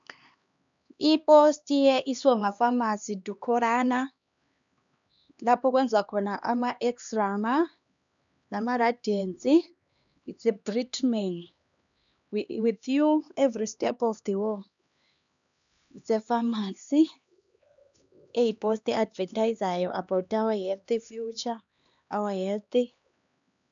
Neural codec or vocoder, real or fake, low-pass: codec, 16 kHz, 2 kbps, X-Codec, HuBERT features, trained on LibriSpeech; fake; 7.2 kHz